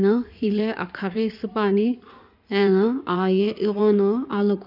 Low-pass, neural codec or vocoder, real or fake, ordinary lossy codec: 5.4 kHz; codec, 16 kHz in and 24 kHz out, 2.2 kbps, FireRedTTS-2 codec; fake; none